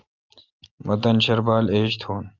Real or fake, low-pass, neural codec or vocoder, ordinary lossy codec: real; 7.2 kHz; none; Opus, 24 kbps